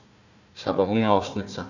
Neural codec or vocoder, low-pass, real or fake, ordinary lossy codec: codec, 16 kHz, 1 kbps, FunCodec, trained on Chinese and English, 50 frames a second; 7.2 kHz; fake; none